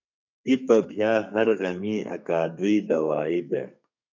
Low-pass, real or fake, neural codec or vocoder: 7.2 kHz; fake; codec, 32 kHz, 1.9 kbps, SNAC